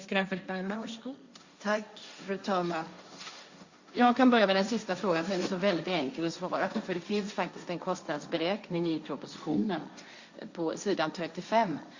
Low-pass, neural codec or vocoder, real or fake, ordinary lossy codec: 7.2 kHz; codec, 16 kHz, 1.1 kbps, Voila-Tokenizer; fake; Opus, 64 kbps